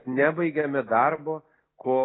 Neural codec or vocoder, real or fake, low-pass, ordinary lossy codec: none; real; 7.2 kHz; AAC, 16 kbps